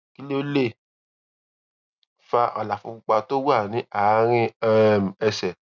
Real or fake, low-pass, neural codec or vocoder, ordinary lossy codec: real; 7.2 kHz; none; none